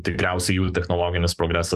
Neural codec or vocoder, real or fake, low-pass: codec, 44.1 kHz, 7.8 kbps, DAC; fake; 14.4 kHz